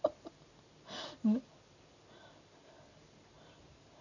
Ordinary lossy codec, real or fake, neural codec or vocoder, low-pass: none; real; none; 7.2 kHz